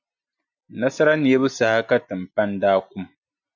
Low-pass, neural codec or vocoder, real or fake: 7.2 kHz; none; real